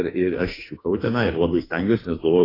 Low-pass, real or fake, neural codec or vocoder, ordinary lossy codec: 5.4 kHz; fake; autoencoder, 48 kHz, 32 numbers a frame, DAC-VAE, trained on Japanese speech; AAC, 24 kbps